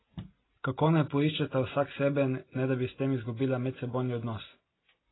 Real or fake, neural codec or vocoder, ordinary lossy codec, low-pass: real; none; AAC, 16 kbps; 7.2 kHz